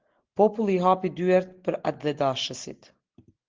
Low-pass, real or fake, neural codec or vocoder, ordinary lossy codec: 7.2 kHz; real; none; Opus, 16 kbps